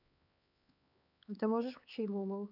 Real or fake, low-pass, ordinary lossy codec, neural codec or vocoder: fake; 5.4 kHz; none; codec, 16 kHz, 4 kbps, X-Codec, HuBERT features, trained on LibriSpeech